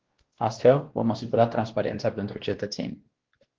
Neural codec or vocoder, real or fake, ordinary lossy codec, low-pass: codec, 16 kHz, 1 kbps, X-Codec, WavLM features, trained on Multilingual LibriSpeech; fake; Opus, 16 kbps; 7.2 kHz